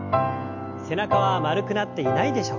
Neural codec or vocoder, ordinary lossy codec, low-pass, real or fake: none; MP3, 48 kbps; 7.2 kHz; real